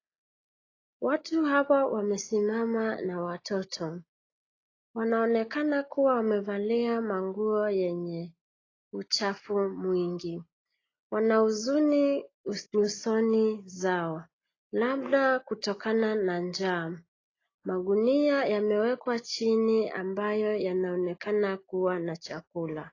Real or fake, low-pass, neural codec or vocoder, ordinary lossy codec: real; 7.2 kHz; none; AAC, 32 kbps